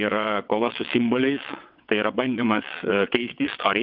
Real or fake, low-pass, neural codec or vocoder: fake; 5.4 kHz; vocoder, 22.05 kHz, 80 mel bands, WaveNeXt